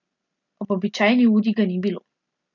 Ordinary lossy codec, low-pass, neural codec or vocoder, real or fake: none; 7.2 kHz; none; real